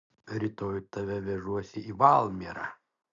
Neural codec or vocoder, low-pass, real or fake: none; 7.2 kHz; real